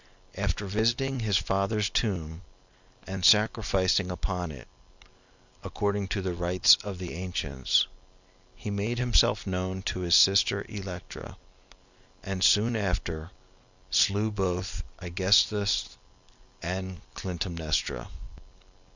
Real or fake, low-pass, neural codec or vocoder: real; 7.2 kHz; none